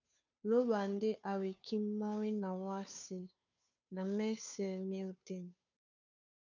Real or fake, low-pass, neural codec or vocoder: fake; 7.2 kHz; codec, 16 kHz, 2 kbps, FunCodec, trained on Chinese and English, 25 frames a second